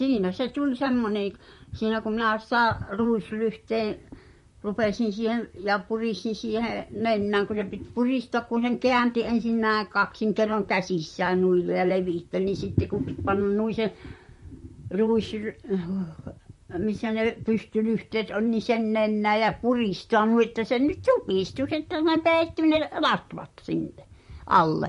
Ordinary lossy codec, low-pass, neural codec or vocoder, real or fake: MP3, 48 kbps; 14.4 kHz; vocoder, 44.1 kHz, 128 mel bands, Pupu-Vocoder; fake